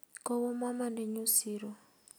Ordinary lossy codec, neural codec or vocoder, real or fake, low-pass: none; none; real; none